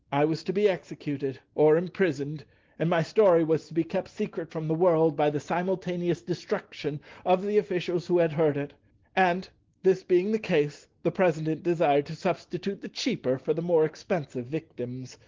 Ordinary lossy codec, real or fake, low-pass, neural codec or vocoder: Opus, 16 kbps; real; 7.2 kHz; none